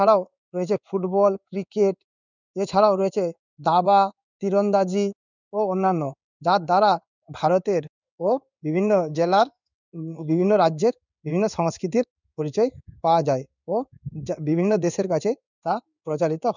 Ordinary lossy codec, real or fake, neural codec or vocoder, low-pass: none; fake; codec, 16 kHz in and 24 kHz out, 1 kbps, XY-Tokenizer; 7.2 kHz